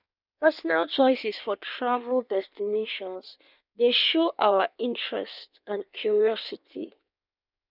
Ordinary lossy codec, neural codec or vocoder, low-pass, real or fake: none; codec, 16 kHz in and 24 kHz out, 1.1 kbps, FireRedTTS-2 codec; 5.4 kHz; fake